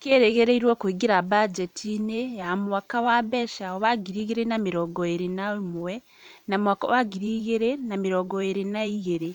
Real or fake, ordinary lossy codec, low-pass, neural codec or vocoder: fake; none; 19.8 kHz; vocoder, 44.1 kHz, 128 mel bands every 512 samples, BigVGAN v2